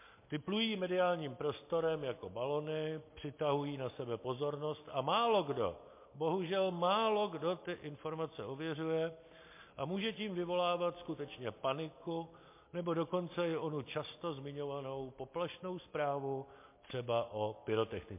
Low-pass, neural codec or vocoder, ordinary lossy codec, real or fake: 3.6 kHz; none; MP3, 24 kbps; real